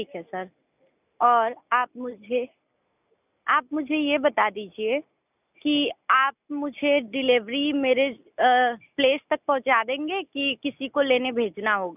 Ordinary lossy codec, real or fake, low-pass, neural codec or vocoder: none; real; 3.6 kHz; none